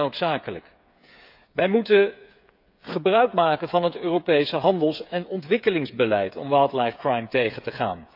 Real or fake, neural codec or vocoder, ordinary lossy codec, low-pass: fake; codec, 16 kHz, 8 kbps, FreqCodec, smaller model; none; 5.4 kHz